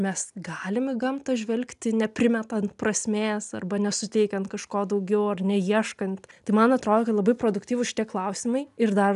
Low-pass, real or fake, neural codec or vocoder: 10.8 kHz; real; none